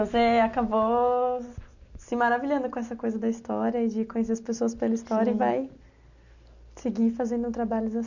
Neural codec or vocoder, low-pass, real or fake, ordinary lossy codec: none; 7.2 kHz; real; none